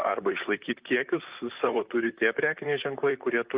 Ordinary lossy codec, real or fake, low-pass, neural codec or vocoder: Opus, 32 kbps; fake; 3.6 kHz; vocoder, 44.1 kHz, 128 mel bands, Pupu-Vocoder